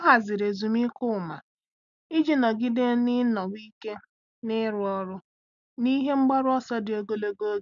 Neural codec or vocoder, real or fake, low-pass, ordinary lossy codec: none; real; 7.2 kHz; none